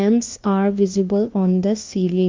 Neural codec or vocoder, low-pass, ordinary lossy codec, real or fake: codec, 16 kHz, 1 kbps, FunCodec, trained on LibriTTS, 50 frames a second; 7.2 kHz; Opus, 32 kbps; fake